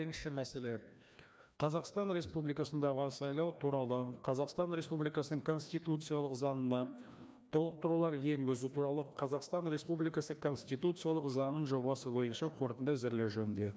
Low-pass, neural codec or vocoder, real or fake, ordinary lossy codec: none; codec, 16 kHz, 1 kbps, FreqCodec, larger model; fake; none